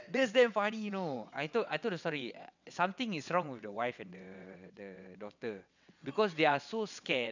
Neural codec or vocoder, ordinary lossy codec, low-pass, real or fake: vocoder, 22.05 kHz, 80 mel bands, WaveNeXt; none; 7.2 kHz; fake